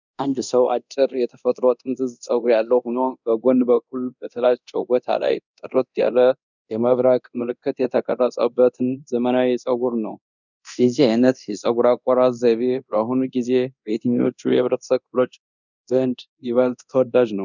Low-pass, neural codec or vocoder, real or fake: 7.2 kHz; codec, 24 kHz, 0.9 kbps, DualCodec; fake